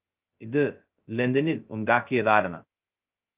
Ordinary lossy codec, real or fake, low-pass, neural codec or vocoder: Opus, 32 kbps; fake; 3.6 kHz; codec, 16 kHz, 0.2 kbps, FocalCodec